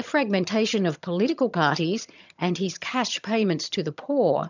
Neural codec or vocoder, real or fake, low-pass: vocoder, 22.05 kHz, 80 mel bands, HiFi-GAN; fake; 7.2 kHz